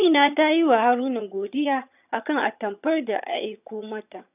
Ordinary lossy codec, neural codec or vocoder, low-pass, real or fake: none; vocoder, 22.05 kHz, 80 mel bands, HiFi-GAN; 3.6 kHz; fake